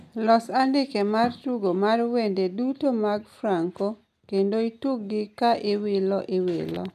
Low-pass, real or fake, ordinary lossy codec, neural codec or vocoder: 14.4 kHz; real; none; none